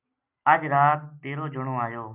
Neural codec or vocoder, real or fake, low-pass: none; real; 3.6 kHz